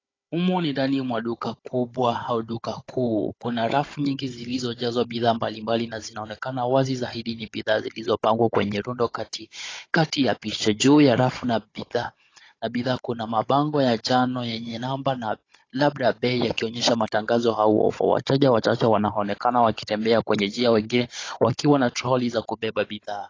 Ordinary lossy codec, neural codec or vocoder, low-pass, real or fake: AAC, 32 kbps; codec, 16 kHz, 16 kbps, FunCodec, trained on Chinese and English, 50 frames a second; 7.2 kHz; fake